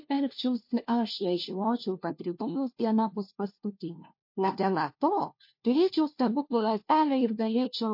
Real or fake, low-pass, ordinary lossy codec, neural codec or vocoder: fake; 5.4 kHz; MP3, 32 kbps; codec, 16 kHz, 1 kbps, FunCodec, trained on LibriTTS, 50 frames a second